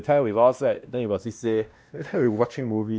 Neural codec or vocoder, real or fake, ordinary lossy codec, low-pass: codec, 16 kHz, 1 kbps, X-Codec, WavLM features, trained on Multilingual LibriSpeech; fake; none; none